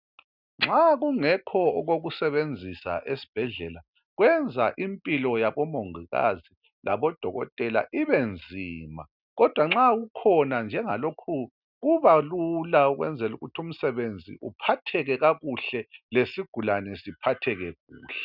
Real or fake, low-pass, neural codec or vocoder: real; 5.4 kHz; none